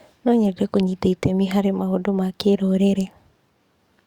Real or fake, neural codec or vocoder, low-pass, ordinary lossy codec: fake; codec, 44.1 kHz, 7.8 kbps, DAC; 19.8 kHz; Opus, 64 kbps